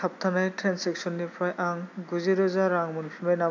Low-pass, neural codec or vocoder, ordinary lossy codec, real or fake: 7.2 kHz; none; MP3, 64 kbps; real